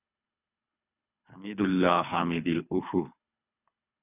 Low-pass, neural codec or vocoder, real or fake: 3.6 kHz; codec, 24 kHz, 3 kbps, HILCodec; fake